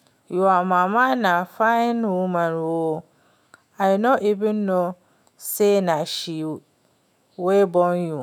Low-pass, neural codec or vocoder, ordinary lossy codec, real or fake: 19.8 kHz; autoencoder, 48 kHz, 128 numbers a frame, DAC-VAE, trained on Japanese speech; none; fake